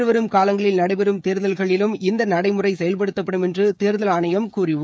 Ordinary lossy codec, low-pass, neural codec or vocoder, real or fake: none; none; codec, 16 kHz, 16 kbps, FreqCodec, smaller model; fake